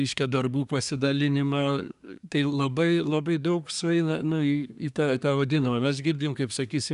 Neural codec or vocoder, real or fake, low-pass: codec, 24 kHz, 1 kbps, SNAC; fake; 10.8 kHz